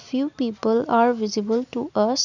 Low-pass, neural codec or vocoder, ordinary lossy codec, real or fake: 7.2 kHz; none; none; real